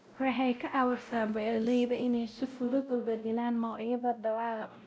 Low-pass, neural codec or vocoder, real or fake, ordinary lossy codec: none; codec, 16 kHz, 0.5 kbps, X-Codec, WavLM features, trained on Multilingual LibriSpeech; fake; none